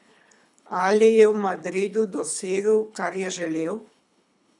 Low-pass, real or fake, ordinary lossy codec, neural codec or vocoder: 10.8 kHz; fake; none; codec, 24 kHz, 3 kbps, HILCodec